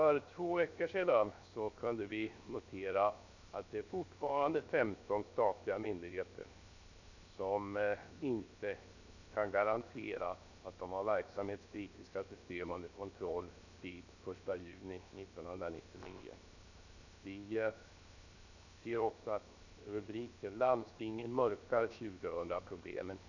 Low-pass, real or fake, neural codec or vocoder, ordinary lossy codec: 7.2 kHz; fake; codec, 16 kHz, 0.7 kbps, FocalCodec; none